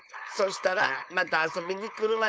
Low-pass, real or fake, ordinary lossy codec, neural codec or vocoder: none; fake; none; codec, 16 kHz, 4.8 kbps, FACodec